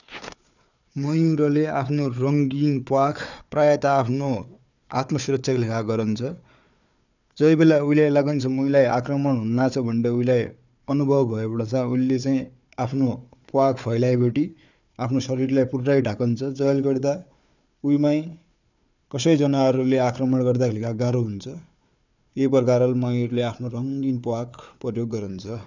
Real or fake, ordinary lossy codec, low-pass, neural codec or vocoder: fake; none; 7.2 kHz; codec, 16 kHz, 4 kbps, FunCodec, trained on Chinese and English, 50 frames a second